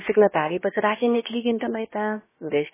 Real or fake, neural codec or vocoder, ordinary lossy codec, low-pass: fake; codec, 16 kHz, about 1 kbps, DyCAST, with the encoder's durations; MP3, 16 kbps; 3.6 kHz